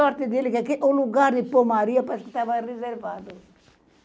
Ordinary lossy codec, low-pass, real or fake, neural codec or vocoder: none; none; real; none